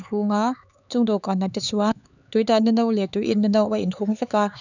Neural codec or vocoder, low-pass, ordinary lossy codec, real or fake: codec, 16 kHz, 4 kbps, X-Codec, HuBERT features, trained on LibriSpeech; 7.2 kHz; none; fake